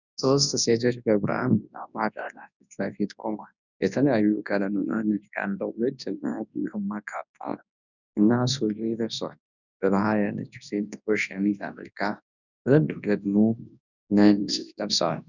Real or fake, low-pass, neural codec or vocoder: fake; 7.2 kHz; codec, 24 kHz, 0.9 kbps, WavTokenizer, large speech release